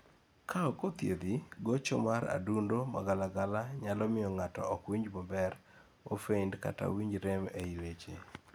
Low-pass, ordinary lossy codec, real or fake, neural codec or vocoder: none; none; real; none